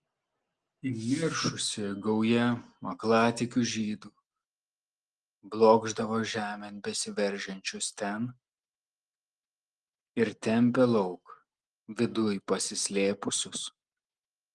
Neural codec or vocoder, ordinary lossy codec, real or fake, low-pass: none; Opus, 24 kbps; real; 10.8 kHz